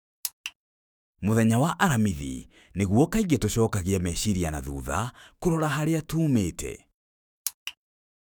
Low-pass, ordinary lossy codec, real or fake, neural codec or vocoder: none; none; fake; autoencoder, 48 kHz, 128 numbers a frame, DAC-VAE, trained on Japanese speech